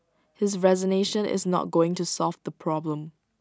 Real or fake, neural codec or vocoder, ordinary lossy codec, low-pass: real; none; none; none